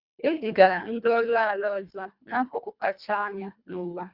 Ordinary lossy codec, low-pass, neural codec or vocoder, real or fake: Opus, 64 kbps; 5.4 kHz; codec, 24 kHz, 1.5 kbps, HILCodec; fake